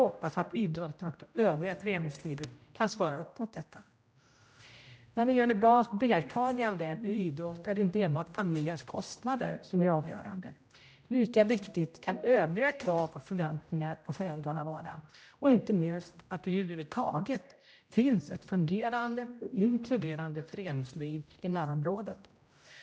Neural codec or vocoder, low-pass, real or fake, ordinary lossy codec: codec, 16 kHz, 0.5 kbps, X-Codec, HuBERT features, trained on general audio; none; fake; none